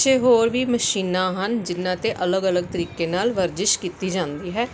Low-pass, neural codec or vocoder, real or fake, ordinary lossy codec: none; none; real; none